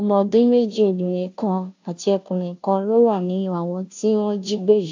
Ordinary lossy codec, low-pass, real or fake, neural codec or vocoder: AAC, 48 kbps; 7.2 kHz; fake; codec, 16 kHz, 0.5 kbps, FunCodec, trained on Chinese and English, 25 frames a second